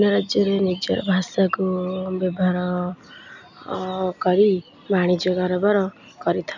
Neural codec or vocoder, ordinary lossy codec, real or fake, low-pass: none; none; real; 7.2 kHz